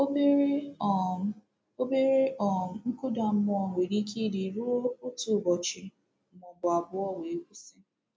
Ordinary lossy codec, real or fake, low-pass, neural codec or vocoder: none; real; none; none